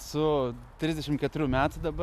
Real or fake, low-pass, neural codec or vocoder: real; 14.4 kHz; none